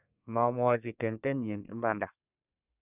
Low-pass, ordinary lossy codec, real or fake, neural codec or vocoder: 3.6 kHz; none; fake; codec, 32 kHz, 1.9 kbps, SNAC